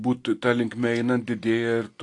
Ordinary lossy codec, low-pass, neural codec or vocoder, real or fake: AAC, 48 kbps; 10.8 kHz; none; real